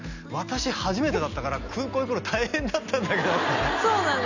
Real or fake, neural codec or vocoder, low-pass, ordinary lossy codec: real; none; 7.2 kHz; none